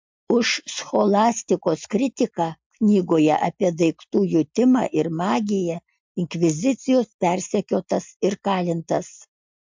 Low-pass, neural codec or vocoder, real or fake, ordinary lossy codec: 7.2 kHz; none; real; MP3, 64 kbps